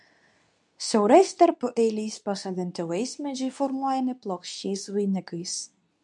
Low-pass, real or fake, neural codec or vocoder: 10.8 kHz; fake; codec, 24 kHz, 0.9 kbps, WavTokenizer, medium speech release version 2